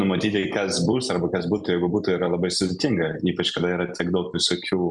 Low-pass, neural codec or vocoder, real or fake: 10.8 kHz; none; real